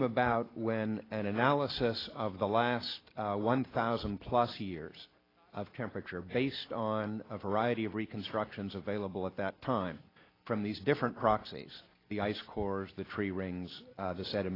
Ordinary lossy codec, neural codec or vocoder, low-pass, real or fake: AAC, 24 kbps; none; 5.4 kHz; real